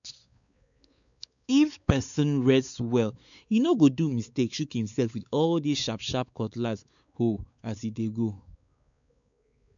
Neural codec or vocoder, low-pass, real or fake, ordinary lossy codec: codec, 16 kHz, 4 kbps, X-Codec, WavLM features, trained on Multilingual LibriSpeech; 7.2 kHz; fake; none